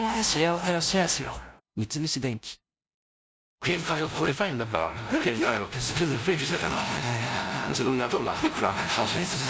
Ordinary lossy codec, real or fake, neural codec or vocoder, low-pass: none; fake; codec, 16 kHz, 0.5 kbps, FunCodec, trained on LibriTTS, 25 frames a second; none